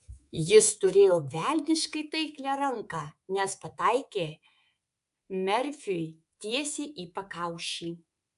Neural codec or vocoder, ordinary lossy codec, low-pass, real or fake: codec, 24 kHz, 3.1 kbps, DualCodec; AAC, 96 kbps; 10.8 kHz; fake